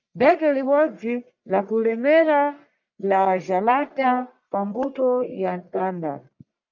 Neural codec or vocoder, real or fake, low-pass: codec, 44.1 kHz, 1.7 kbps, Pupu-Codec; fake; 7.2 kHz